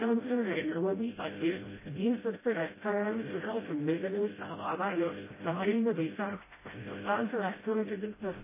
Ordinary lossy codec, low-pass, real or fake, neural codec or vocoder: MP3, 16 kbps; 3.6 kHz; fake; codec, 16 kHz, 0.5 kbps, FreqCodec, smaller model